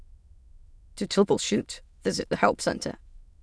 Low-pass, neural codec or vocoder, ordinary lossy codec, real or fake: none; autoencoder, 22.05 kHz, a latent of 192 numbers a frame, VITS, trained on many speakers; none; fake